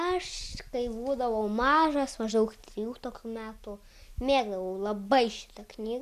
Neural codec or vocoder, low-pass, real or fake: none; 14.4 kHz; real